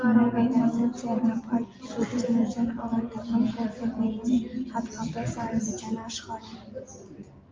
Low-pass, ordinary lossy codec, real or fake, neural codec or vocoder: 7.2 kHz; Opus, 24 kbps; real; none